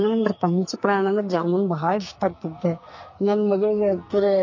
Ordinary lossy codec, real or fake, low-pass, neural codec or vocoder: MP3, 32 kbps; fake; 7.2 kHz; codec, 44.1 kHz, 2.6 kbps, SNAC